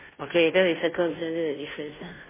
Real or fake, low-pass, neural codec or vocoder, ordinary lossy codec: fake; 3.6 kHz; codec, 16 kHz, 0.5 kbps, FunCodec, trained on Chinese and English, 25 frames a second; MP3, 16 kbps